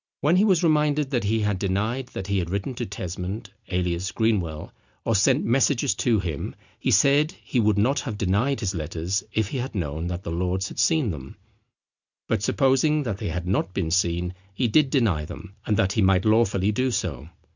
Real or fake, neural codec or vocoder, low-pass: real; none; 7.2 kHz